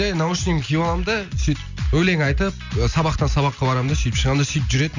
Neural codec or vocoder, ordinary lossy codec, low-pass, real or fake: none; none; 7.2 kHz; real